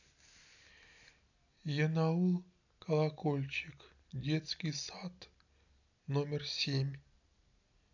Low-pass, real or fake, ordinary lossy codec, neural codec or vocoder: 7.2 kHz; real; none; none